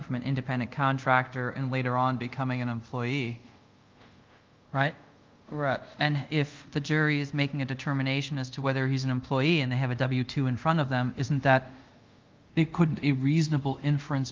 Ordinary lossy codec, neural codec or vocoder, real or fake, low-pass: Opus, 24 kbps; codec, 24 kHz, 0.5 kbps, DualCodec; fake; 7.2 kHz